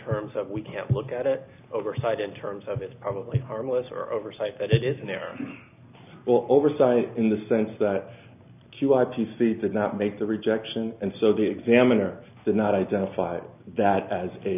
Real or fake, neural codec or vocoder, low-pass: real; none; 3.6 kHz